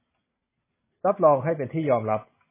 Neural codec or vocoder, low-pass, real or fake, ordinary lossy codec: none; 3.6 kHz; real; MP3, 16 kbps